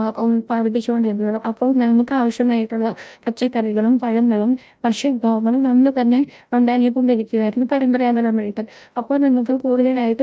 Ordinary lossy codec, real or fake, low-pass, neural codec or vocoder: none; fake; none; codec, 16 kHz, 0.5 kbps, FreqCodec, larger model